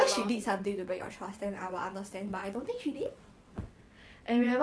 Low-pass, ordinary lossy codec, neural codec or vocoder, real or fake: none; none; vocoder, 22.05 kHz, 80 mel bands, WaveNeXt; fake